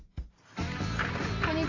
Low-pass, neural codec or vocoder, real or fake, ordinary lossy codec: 7.2 kHz; none; real; AAC, 48 kbps